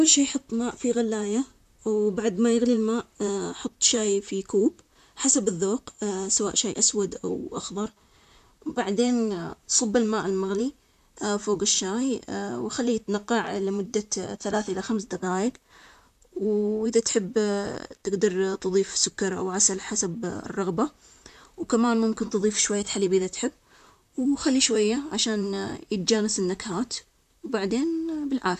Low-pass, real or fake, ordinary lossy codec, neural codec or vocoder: 14.4 kHz; fake; none; vocoder, 44.1 kHz, 128 mel bands, Pupu-Vocoder